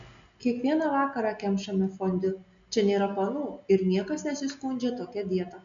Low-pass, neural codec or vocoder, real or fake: 7.2 kHz; none; real